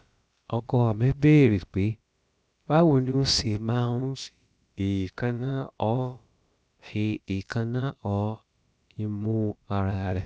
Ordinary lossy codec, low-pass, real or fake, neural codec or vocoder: none; none; fake; codec, 16 kHz, about 1 kbps, DyCAST, with the encoder's durations